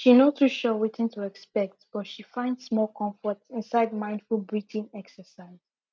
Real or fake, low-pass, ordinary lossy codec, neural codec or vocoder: real; none; none; none